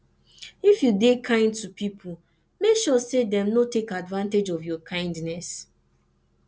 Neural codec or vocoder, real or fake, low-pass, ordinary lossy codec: none; real; none; none